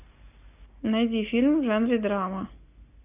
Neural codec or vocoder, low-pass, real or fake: none; 3.6 kHz; real